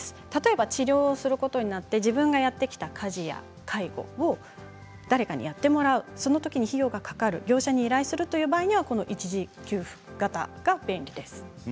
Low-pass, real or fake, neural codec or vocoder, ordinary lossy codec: none; real; none; none